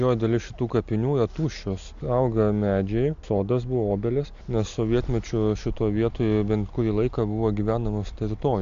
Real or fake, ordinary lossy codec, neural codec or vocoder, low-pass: real; AAC, 64 kbps; none; 7.2 kHz